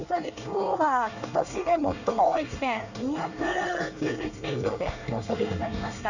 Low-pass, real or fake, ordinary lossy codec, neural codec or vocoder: 7.2 kHz; fake; none; codec, 24 kHz, 1 kbps, SNAC